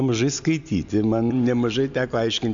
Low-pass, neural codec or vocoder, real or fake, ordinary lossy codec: 7.2 kHz; none; real; AAC, 64 kbps